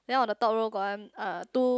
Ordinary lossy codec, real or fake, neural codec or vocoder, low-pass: none; real; none; none